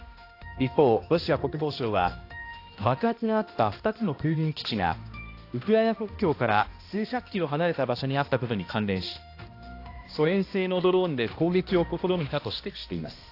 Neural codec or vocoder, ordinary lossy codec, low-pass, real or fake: codec, 16 kHz, 1 kbps, X-Codec, HuBERT features, trained on balanced general audio; AAC, 32 kbps; 5.4 kHz; fake